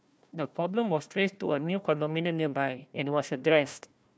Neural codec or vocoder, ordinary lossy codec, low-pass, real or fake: codec, 16 kHz, 1 kbps, FunCodec, trained on Chinese and English, 50 frames a second; none; none; fake